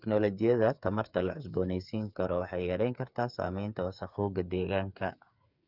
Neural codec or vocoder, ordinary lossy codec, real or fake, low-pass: codec, 16 kHz, 8 kbps, FreqCodec, smaller model; none; fake; 5.4 kHz